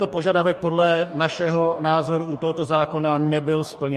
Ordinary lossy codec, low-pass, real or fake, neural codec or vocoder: MP3, 64 kbps; 14.4 kHz; fake; codec, 44.1 kHz, 2.6 kbps, DAC